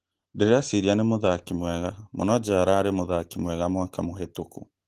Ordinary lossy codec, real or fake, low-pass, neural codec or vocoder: Opus, 16 kbps; real; 14.4 kHz; none